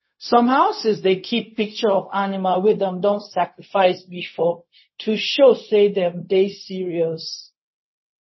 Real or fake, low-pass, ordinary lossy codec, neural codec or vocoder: fake; 7.2 kHz; MP3, 24 kbps; codec, 16 kHz, 0.4 kbps, LongCat-Audio-Codec